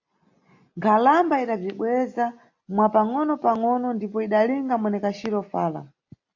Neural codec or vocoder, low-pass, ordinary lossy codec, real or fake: none; 7.2 kHz; AAC, 48 kbps; real